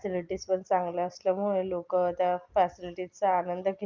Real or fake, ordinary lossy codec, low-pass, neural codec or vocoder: real; Opus, 32 kbps; 7.2 kHz; none